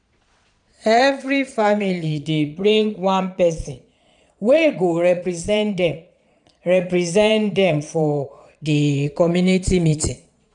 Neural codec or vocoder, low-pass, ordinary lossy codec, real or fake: vocoder, 22.05 kHz, 80 mel bands, Vocos; 9.9 kHz; none; fake